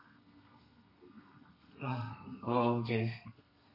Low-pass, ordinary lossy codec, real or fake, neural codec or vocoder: 5.4 kHz; MP3, 32 kbps; fake; codec, 32 kHz, 1.9 kbps, SNAC